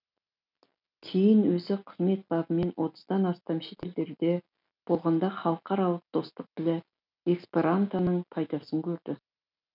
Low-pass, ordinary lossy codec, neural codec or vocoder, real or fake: 5.4 kHz; none; none; real